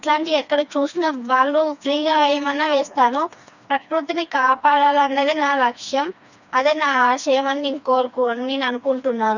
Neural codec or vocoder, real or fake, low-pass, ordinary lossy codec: codec, 16 kHz, 2 kbps, FreqCodec, smaller model; fake; 7.2 kHz; none